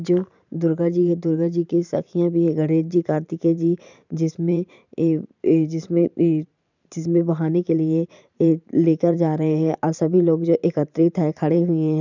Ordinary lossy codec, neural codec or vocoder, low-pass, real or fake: none; vocoder, 44.1 kHz, 128 mel bands every 512 samples, BigVGAN v2; 7.2 kHz; fake